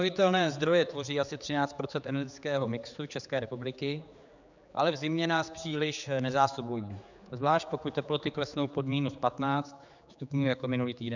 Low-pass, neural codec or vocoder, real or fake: 7.2 kHz; codec, 16 kHz, 4 kbps, X-Codec, HuBERT features, trained on general audio; fake